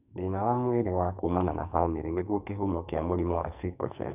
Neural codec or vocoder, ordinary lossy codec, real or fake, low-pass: codec, 44.1 kHz, 2.6 kbps, SNAC; none; fake; 3.6 kHz